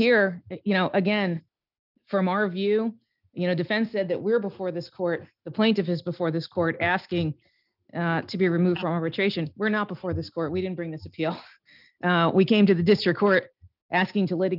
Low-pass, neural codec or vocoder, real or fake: 5.4 kHz; none; real